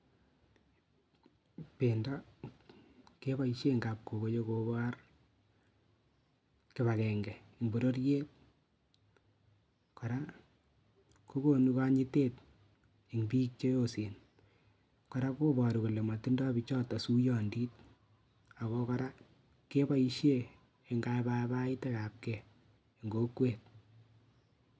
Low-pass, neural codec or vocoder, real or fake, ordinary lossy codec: none; none; real; none